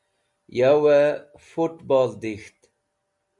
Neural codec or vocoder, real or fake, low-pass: none; real; 10.8 kHz